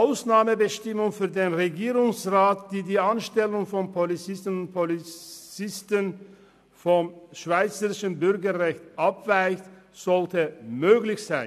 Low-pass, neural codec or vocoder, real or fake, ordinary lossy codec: 14.4 kHz; none; real; MP3, 96 kbps